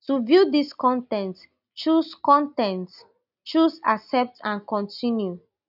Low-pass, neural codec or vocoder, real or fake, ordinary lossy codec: 5.4 kHz; none; real; none